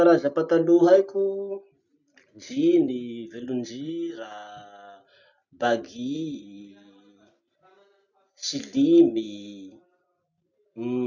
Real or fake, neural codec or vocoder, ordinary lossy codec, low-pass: real; none; none; 7.2 kHz